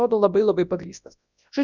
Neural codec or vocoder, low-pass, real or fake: codec, 24 kHz, 0.9 kbps, WavTokenizer, large speech release; 7.2 kHz; fake